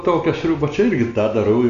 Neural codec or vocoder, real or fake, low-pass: none; real; 7.2 kHz